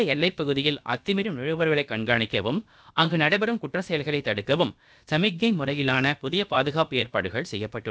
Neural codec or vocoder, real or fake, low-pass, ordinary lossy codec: codec, 16 kHz, about 1 kbps, DyCAST, with the encoder's durations; fake; none; none